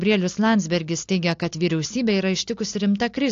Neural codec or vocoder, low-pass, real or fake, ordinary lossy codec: none; 7.2 kHz; real; AAC, 48 kbps